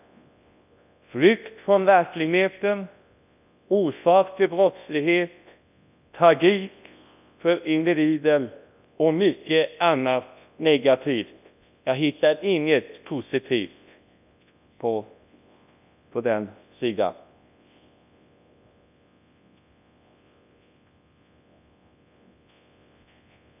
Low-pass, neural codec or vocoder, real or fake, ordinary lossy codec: 3.6 kHz; codec, 24 kHz, 0.9 kbps, WavTokenizer, large speech release; fake; none